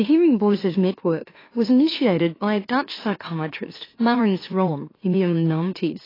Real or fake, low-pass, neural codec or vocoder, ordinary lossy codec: fake; 5.4 kHz; autoencoder, 44.1 kHz, a latent of 192 numbers a frame, MeloTTS; AAC, 24 kbps